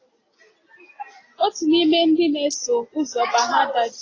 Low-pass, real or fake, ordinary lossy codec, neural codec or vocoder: 7.2 kHz; real; AAC, 32 kbps; none